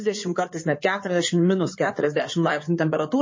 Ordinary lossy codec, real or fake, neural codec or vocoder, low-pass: MP3, 32 kbps; fake; codec, 16 kHz in and 24 kHz out, 2.2 kbps, FireRedTTS-2 codec; 7.2 kHz